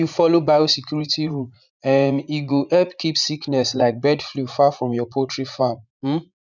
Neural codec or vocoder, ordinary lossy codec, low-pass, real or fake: vocoder, 44.1 kHz, 80 mel bands, Vocos; none; 7.2 kHz; fake